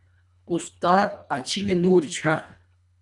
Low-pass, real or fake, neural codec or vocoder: 10.8 kHz; fake; codec, 24 kHz, 1.5 kbps, HILCodec